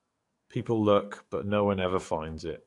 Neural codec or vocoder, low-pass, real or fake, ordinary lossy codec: codec, 44.1 kHz, 7.8 kbps, DAC; 10.8 kHz; fake; AAC, 48 kbps